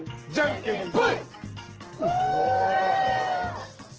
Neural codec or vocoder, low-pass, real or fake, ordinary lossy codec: codec, 44.1 kHz, 7.8 kbps, DAC; 7.2 kHz; fake; Opus, 16 kbps